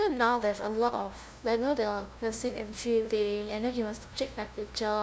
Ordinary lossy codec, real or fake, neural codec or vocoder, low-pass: none; fake; codec, 16 kHz, 0.5 kbps, FunCodec, trained on LibriTTS, 25 frames a second; none